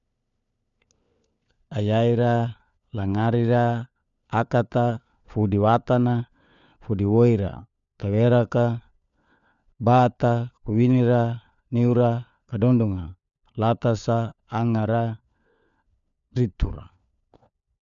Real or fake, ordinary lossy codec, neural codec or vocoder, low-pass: fake; MP3, 96 kbps; codec, 16 kHz, 4 kbps, FunCodec, trained on LibriTTS, 50 frames a second; 7.2 kHz